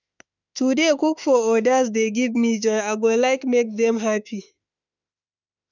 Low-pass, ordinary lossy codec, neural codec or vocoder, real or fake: 7.2 kHz; none; autoencoder, 48 kHz, 32 numbers a frame, DAC-VAE, trained on Japanese speech; fake